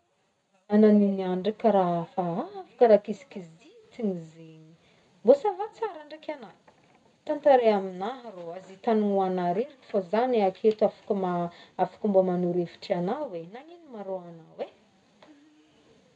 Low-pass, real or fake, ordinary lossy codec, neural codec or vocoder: 10.8 kHz; real; none; none